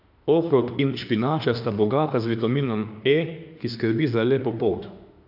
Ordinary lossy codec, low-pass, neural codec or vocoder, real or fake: AAC, 48 kbps; 5.4 kHz; autoencoder, 48 kHz, 32 numbers a frame, DAC-VAE, trained on Japanese speech; fake